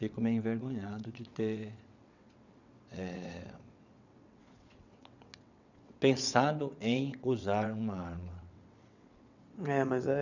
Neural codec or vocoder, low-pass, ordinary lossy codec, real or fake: vocoder, 22.05 kHz, 80 mel bands, WaveNeXt; 7.2 kHz; none; fake